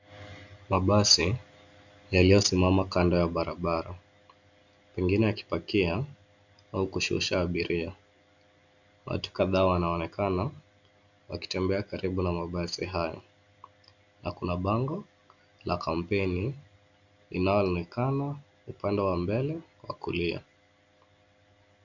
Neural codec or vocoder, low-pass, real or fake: none; 7.2 kHz; real